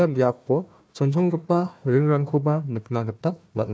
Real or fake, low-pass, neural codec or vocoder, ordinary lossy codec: fake; none; codec, 16 kHz, 1 kbps, FunCodec, trained on Chinese and English, 50 frames a second; none